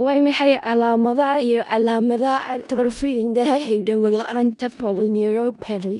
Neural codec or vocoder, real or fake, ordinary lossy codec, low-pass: codec, 16 kHz in and 24 kHz out, 0.4 kbps, LongCat-Audio-Codec, four codebook decoder; fake; none; 10.8 kHz